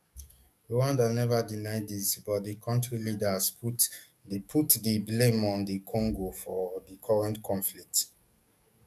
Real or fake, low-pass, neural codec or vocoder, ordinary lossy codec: fake; 14.4 kHz; codec, 44.1 kHz, 7.8 kbps, DAC; none